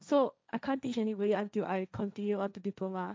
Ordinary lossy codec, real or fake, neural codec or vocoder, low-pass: none; fake; codec, 16 kHz, 1.1 kbps, Voila-Tokenizer; none